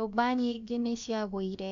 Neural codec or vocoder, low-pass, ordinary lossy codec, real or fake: codec, 16 kHz, about 1 kbps, DyCAST, with the encoder's durations; 7.2 kHz; none; fake